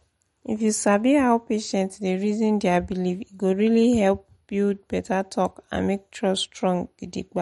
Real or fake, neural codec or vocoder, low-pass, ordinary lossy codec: real; none; 19.8 kHz; MP3, 48 kbps